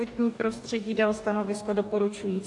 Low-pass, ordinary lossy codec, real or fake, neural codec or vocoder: 10.8 kHz; AAC, 64 kbps; fake; codec, 44.1 kHz, 2.6 kbps, DAC